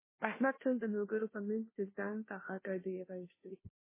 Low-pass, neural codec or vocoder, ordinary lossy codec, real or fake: 3.6 kHz; codec, 16 kHz, 0.5 kbps, FunCodec, trained on Chinese and English, 25 frames a second; MP3, 16 kbps; fake